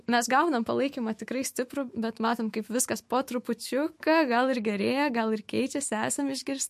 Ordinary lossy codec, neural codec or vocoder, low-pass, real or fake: MP3, 64 kbps; autoencoder, 48 kHz, 128 numbers a frame, DAC-VAE, trained on Japanese speech; 14.4 kHz; fake